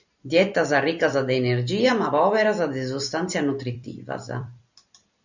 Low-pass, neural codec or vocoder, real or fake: 7.2 kHz; vocoder, 44.1 kHz, 128 mel bands every 256 samples, BigVGAN v2; fake